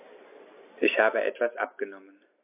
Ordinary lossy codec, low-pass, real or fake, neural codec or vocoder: MP3, 24 kbps; 3.6 kHz; real; none